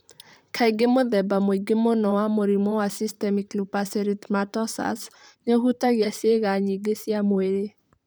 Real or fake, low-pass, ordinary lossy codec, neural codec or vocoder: fake; none; none; vocoder, 44.1 kHz, 128 mel bands, Pupu-Vocoder